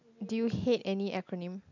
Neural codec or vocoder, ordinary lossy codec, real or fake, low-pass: none; none; real; 7.2 kHz